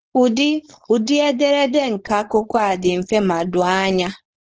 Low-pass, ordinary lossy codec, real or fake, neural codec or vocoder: 7.2 kHz; Opus, 16 kbps; fake; codec, 16 kHz, 4.8 kbps, FACodec